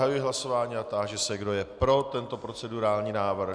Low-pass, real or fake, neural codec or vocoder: 9.9 kHz; real; none